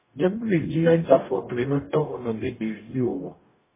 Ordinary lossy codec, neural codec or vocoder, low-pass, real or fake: MP3, 16 kbps; codec, 44.1 kHz, 0.9 kbps, DAC; 3.6 kHz; fake